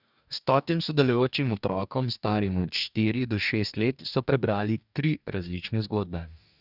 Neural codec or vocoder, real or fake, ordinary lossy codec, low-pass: codec, 44.1 kHz, 2.6 kbps, DAC; fake; none; 5.4 kHz